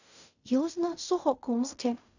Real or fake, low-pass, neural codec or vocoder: fake; 7.2 kHz; codec, 16 kHz in and 24 kHz out, 0.4 kbps, LongCat-Audio-Codec, fine tuned four codebook decoder